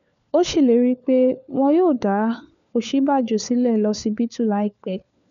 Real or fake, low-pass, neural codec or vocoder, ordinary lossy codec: fake; 7.2 kHz; codec, 16 kHz, 4 kbps, FunCodec, trained on LibriTTS, 50 frames a second; MP3, 96 kbps